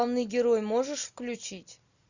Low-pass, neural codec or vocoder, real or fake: 7.2 kHz; none; real